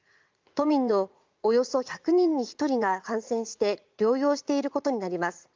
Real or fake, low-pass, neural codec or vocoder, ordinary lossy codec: fake; 7.2 kHz; vocoder, 44.1 kHz, 80 mel bands, Vocos; Opus, 24 kbps